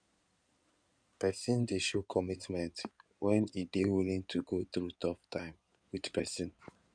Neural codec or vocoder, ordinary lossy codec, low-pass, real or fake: codec, 16 kHz in and 24 kHz out, 2.2 kbps, FireRedTTS-2 codec; none; 9.9 kHz; fake